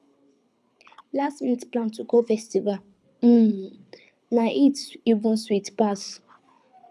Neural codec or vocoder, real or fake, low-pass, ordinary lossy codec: codec, 24 kHz, 6 kbps, HILCodec; fake; none; none